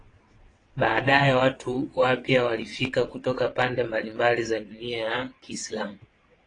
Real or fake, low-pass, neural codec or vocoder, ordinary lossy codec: fake; 9.9 kHz; vocoder, 22.05 kHz, 80 mel bands, WaveNeXt; AAC, 32 kbps